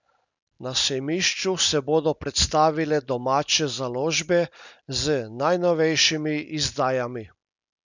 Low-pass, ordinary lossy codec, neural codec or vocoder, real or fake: 7.2 kHz; none; none; real